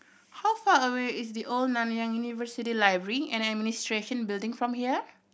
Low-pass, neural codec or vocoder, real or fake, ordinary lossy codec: none; none; real; none